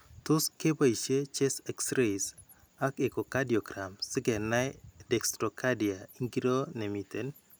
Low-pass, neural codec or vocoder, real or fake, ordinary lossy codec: none; none; real; none